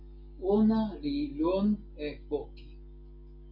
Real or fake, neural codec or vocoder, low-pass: real; none; 5.4 kHz